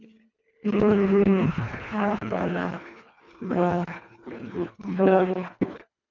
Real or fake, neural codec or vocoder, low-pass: fake; codec, 24 kHz, 1.5 kbps, HILCodec; 7.2 kHz